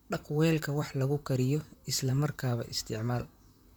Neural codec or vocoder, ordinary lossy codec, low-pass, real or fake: vocoder, 44.1 kHz, 128 mel bands, Pupu-Vocoder; none; none; fake